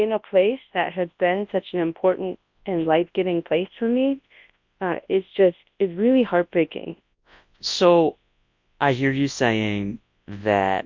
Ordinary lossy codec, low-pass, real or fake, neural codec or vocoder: MP3, 48 kbps; 7.2 kHz; fake; codec, 24 kHz, 0.9 kbps, WavTokenizer, large speech release